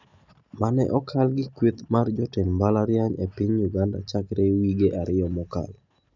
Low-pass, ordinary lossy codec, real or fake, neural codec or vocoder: 7.2 kHz; none; real; none